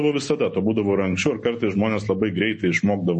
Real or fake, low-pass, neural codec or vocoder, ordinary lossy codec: real; 10.8 kHz; none; MP3, 32 kbps